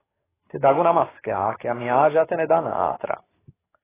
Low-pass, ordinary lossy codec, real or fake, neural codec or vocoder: 3.6 kHz; AAC, 16 kbps; fake; codec, 44.1 kHz, 7.8 kbps, DAC